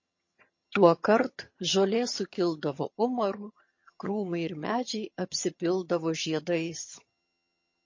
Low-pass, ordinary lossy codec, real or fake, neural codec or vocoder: 7.2 kHz; MP3, 32 kbps; fake; vocoder, 22.05 kHz, 80 mel bands, HiFi-GAN